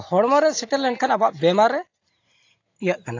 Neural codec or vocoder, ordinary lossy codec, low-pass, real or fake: none; AAC, 48 kbps; 7.2 kHz; real